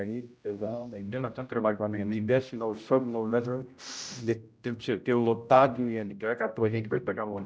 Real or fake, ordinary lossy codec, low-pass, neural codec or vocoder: fake; none; none; codec, 16 kHz, 0.5 kbps, X-Codec, HuBERT features, trained on general audio